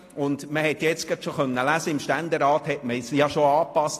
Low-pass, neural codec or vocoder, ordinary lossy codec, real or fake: 14.4 kHz; none; AAC, 48 kbps; real